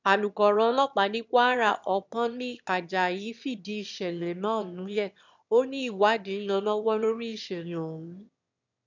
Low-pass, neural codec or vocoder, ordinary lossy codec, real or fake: 7.2 kHz; autoencoder, 22.05 kHz, a latent of 192 numbers a frame, VITS, trained on one speaker; none; fake